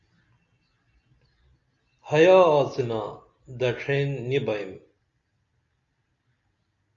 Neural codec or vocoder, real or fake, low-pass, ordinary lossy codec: none; real; 7.2 kHz; Opus, 64 kbps